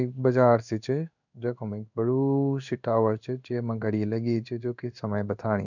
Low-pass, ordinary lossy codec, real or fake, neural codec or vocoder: 7.2 kHz; none; fake; codec, 16 kHz in and 24 kHz out, 1 kbps, XY-Tokenizer